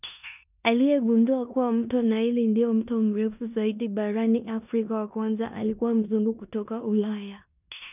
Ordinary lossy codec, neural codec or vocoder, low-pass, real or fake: none; codec, 16 kHz in and 24 kHz out, 0.9 kbps, LongCat-Audio-Codec, four codebook decoder; 3.6 kHz; fake